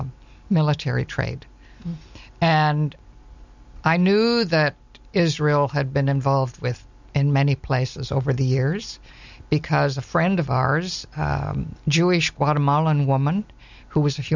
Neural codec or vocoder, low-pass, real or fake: none; 7.2 kHz; real